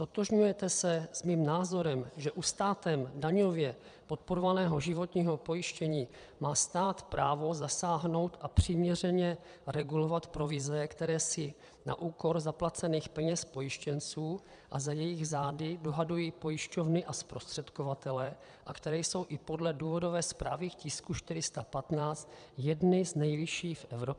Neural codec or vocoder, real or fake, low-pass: vocoder, 22.05 kHz, 80 mel bands, Vocos; fake; 9.9 kHz